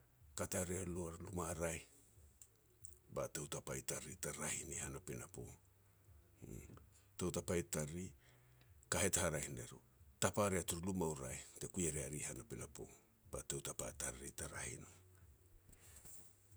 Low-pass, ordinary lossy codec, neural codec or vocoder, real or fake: none; none; none; real